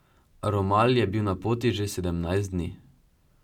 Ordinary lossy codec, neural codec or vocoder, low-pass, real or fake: none; none; 19.8 kHz; real